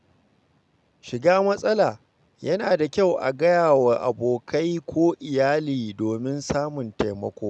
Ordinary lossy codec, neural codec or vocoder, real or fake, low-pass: none; none; real; none